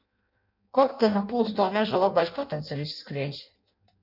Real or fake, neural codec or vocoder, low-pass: fake; codec, 16 kHz in and 24 kHz out, 0.6 kbps, FireRedTTS-2 codec; 5.4 kHz